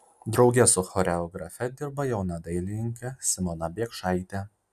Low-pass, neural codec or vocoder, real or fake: 14.4 kHz; none; real